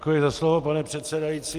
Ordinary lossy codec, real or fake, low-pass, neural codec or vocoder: Opus, 32 kbps; real; 14.4 kHz; none